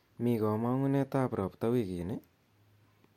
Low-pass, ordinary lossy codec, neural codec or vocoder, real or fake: 19.8 kHz; MP3, 64 kbps; none; real